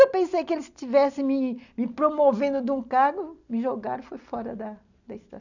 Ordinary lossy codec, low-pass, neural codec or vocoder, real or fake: none; 7.2 kHz; none; real